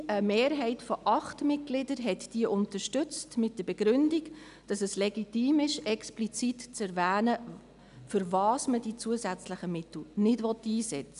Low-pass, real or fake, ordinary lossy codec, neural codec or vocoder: 10.8 kHz; real; none; none